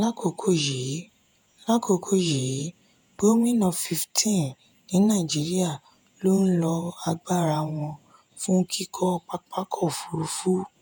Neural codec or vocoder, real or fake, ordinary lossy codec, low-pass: vocoder, 48 kHz, 128 mel bands, Vocos; fake; none; none